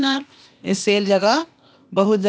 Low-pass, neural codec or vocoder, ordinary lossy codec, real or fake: none; codec, 16 kHz, 0.8 kbps, ZipCodec; none; fake